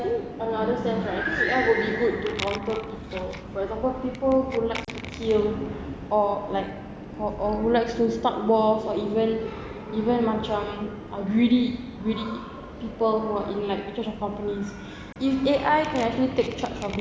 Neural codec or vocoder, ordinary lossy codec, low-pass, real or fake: none; none; none; real